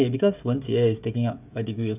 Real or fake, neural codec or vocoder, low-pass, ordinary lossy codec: fake; codec, 16 kHz, 16 kbps, FreqCodec, smaller model; 3.6 kHz; none